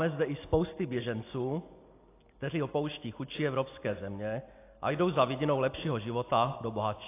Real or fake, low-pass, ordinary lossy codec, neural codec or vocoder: real; 3.6 kHz; AAC, 24 kbps; none